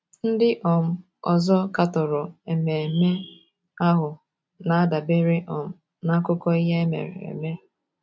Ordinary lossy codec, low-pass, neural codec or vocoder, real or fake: none; none; none; real